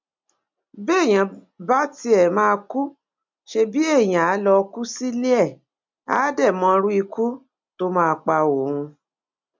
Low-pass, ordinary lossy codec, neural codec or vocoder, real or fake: 7.2 kHz; MP3, 64 kbps; none; real